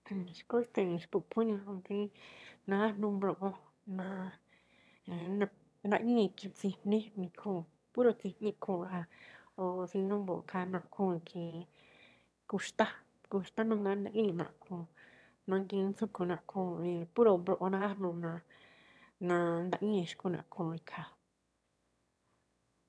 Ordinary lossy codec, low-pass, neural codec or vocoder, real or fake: none; none; autoencoder, 22.05 kHz, a latent of 192 numbers a frame, VITS, trained on one speaker; fake